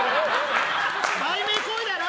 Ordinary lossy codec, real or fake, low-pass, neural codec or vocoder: none; real; none; none